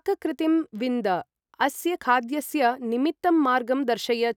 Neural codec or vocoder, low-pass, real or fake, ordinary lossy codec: none; 19.8 kHz; real; none